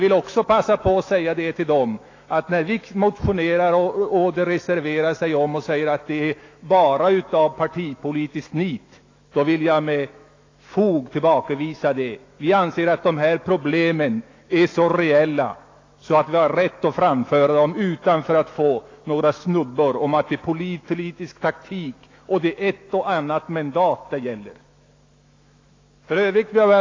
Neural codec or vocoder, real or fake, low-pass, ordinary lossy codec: autoencoder, 48 kHz, 128 numbers a frame, DAC-VAE, trained on Japanese speech; fake; 7.2 kHz; AAC, 32 kbps